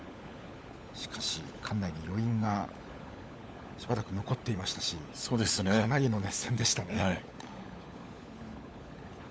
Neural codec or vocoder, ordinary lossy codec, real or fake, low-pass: codec, 16 kHz, 16 kbps, FunCodec, trained on LibriTTS, 50 frames a second; none; fake; none